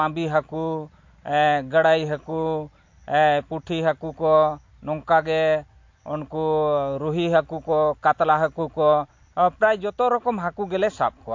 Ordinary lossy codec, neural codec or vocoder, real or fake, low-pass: MP3, 48 kbps; none; real; 7.2 kHz